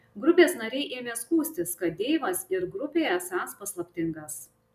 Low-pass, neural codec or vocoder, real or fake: 14.4 kHz; none; real